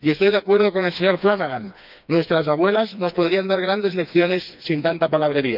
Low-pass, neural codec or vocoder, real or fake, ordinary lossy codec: 5.4 kHz; codec, 16 kHz, 2 kbps, FreqCodec, smaller model; fake; none